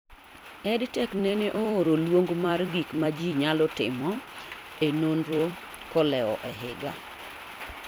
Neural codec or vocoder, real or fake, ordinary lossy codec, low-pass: vocoder, 44.1 kHz, 128 mel bands every 256 samples, BigVGAN v2; fake; none; none